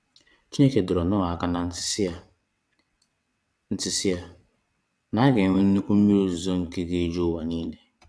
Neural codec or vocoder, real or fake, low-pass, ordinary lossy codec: vocoder, 22.05 kHz, 80 mel bands, WaveNeXt; fake; none; none